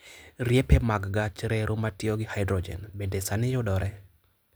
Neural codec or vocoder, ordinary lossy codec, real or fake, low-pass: none; none; real; none